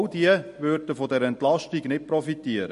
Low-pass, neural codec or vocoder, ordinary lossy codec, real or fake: 14.4 kHz; none; MP3, 48 kbps; real